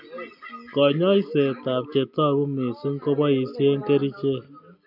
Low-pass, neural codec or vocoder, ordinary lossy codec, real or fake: 5.4 kHz; none; none; real